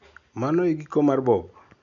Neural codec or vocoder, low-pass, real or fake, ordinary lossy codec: none; 7.2 kHz; real; none